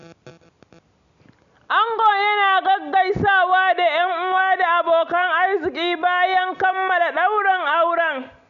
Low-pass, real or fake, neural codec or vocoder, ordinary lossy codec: 7.2 kHz; real; none; none